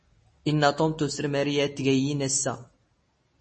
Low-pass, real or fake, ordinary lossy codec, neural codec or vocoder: 10.8 kHz; real; MP3, 32 kbps; none